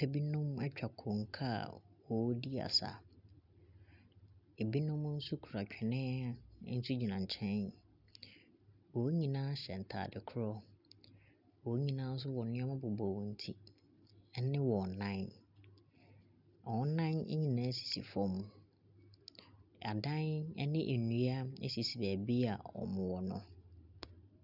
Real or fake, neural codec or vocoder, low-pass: real; none; 5.4 kHz